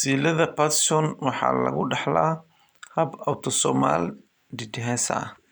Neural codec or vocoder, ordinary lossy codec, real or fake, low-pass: none; none; real; none